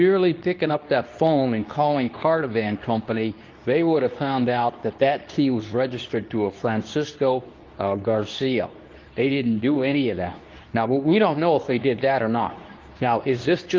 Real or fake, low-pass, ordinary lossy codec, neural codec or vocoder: fake; 7.2 kHz; Opus, 32 kbps; codec, 24 kHz, 0.9 kbps, WavTokenizer, medium speech release version 2